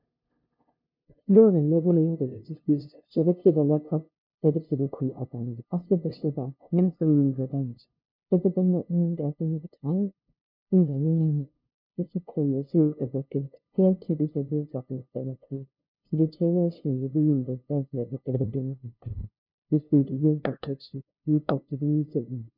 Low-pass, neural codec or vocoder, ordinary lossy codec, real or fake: 5.4 kHz; codec, 16 kHz, 0.5 kbps, FunCodec, trained on LibriTTS, 25 frames a second; MP3, 48 kbps; fake